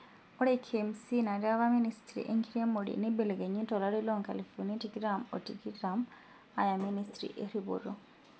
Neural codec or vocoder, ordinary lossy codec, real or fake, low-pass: none; none; real; none